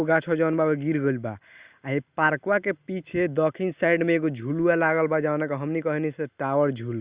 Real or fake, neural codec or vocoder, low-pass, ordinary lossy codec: real; none; 3.6 kHz; Opus, 64 kbps